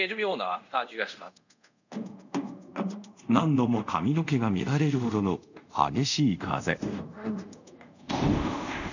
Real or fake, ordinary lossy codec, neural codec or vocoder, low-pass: fake; none; codec, 24 kHz, 0.5 kbps, DualCodec; 7.2 kHz